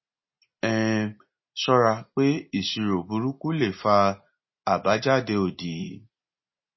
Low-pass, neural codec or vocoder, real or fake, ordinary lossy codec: 7.2 kHz; none; real; MP3, 24 kbps